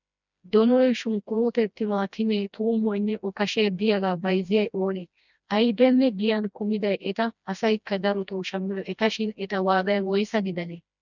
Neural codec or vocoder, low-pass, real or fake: codec, 16 kHz, 1 kbps, FreqCodec, smaller model; 7.2 kHz; fake